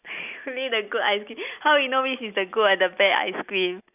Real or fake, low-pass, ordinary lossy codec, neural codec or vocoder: real; 3.6 kHz; none; none